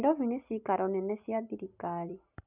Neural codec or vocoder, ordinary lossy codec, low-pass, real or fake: none; none; 3.6 kHz; real